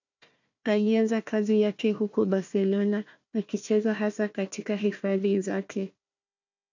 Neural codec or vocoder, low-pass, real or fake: codec, 16 kHz, 1 kbps, FunCodec, trained on Chinese and English, 50 frames a second; 7.2 kHz; fake